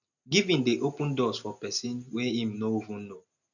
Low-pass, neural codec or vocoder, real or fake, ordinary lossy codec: 7.2 kHz; none; real; none